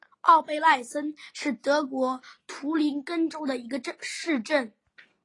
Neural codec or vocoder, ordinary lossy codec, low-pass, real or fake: none; AAC, 32 kbps; 10.8 kHz; real